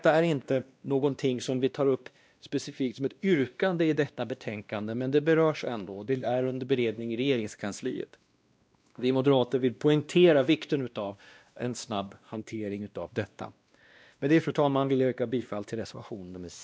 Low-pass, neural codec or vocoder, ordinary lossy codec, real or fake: none; codec, 16 kHz, 1 kbps, X-Codec, WavLM features, trained on Multilingual LibriSpeech; none; fake